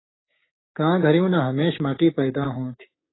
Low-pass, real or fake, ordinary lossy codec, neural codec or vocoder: 7.2 kHz; fake; AAC, 16 kbps; codec, 16 kHz, 6 kbps, DAC